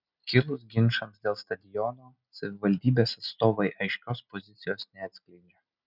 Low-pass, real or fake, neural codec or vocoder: 5.4 kHz; real; none